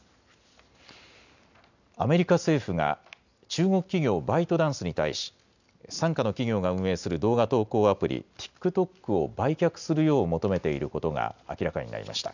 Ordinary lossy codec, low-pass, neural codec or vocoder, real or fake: none; 7.2 kHz; none; real